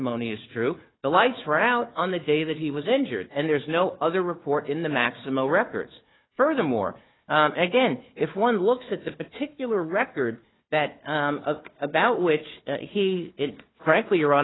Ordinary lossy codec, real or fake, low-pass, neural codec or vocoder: AAC, 16 kbps; fake; 7.2 kHz; codec, 16 kHz, 4 kbps, FunCodec, trained on Chinese and English, 50 frames a second